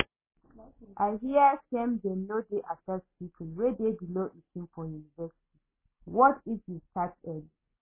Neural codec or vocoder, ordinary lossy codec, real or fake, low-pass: none; MP3, 16 kbps; real; 3.6 kHz